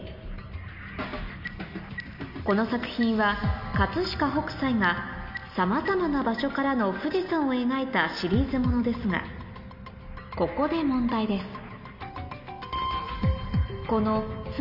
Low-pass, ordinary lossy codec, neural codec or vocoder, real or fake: 5.4 kHz; none; none; real